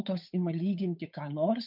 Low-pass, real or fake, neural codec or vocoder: 5.4 kHz; fake; codec, 16 kHz, 8 kbps, FunCodec, trained on Chinese and English, 25 frames a second